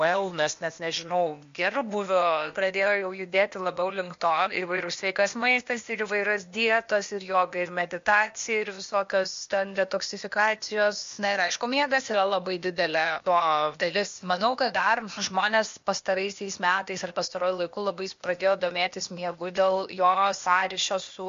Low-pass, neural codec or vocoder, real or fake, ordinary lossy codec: 7.2 kHz; codec, 16 kHz, 0.8 kbps, ZipCodec; fake; MP3, 48 kbps